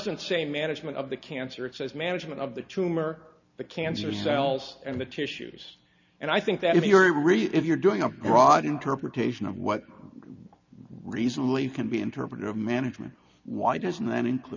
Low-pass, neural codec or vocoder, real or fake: 7.2 kHz; none; real